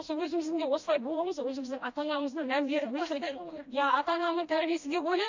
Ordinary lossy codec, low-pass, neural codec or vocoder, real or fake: MP3, 48 kbps; 7.2 kHz; codec, 16 kHz, 1 kbps, FreqCodec, smaller model; fake